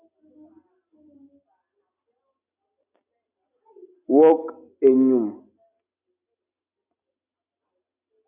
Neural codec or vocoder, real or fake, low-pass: none; real; 3.6 kHz